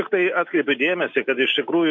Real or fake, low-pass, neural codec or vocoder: real; 7.2 kHz; none